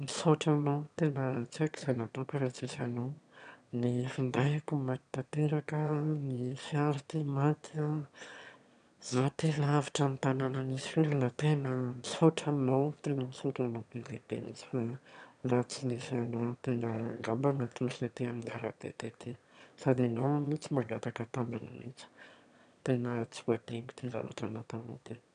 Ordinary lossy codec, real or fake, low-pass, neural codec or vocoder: none; fake; 9.9 kHz; autoencoder, 22.05 kHz, a latent of 192 numbers a frame, VITS, trained on one speaker